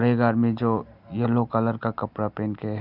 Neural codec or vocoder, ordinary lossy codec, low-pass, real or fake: none; none; 5.4 kHz; real